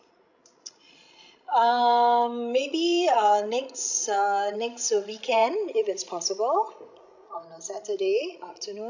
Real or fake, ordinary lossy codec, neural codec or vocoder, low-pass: fake; none; codec, 16 kHz, 16 kbps, FreqCodec, larger model; 7.2 kHz